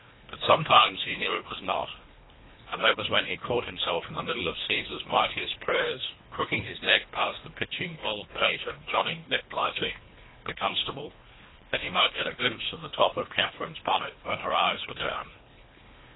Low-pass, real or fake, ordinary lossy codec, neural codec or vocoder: 7.2 kHz; fake; AAC, 16 kbps; codec, 24 kHz, 1.5 kbps, HILCodec